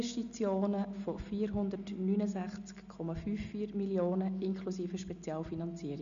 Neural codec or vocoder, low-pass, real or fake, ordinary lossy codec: none; 7.2 kHz; real; none